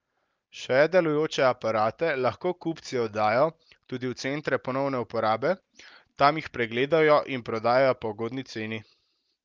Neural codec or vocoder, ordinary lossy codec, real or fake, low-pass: none; Opus, 24 kbps; real; 7.2 kHz